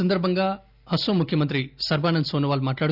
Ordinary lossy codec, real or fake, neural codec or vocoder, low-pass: none; real; none; 5.4 kHz